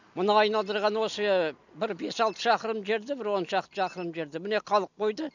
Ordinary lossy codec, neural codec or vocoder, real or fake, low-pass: none; none; real; 7.2 kHz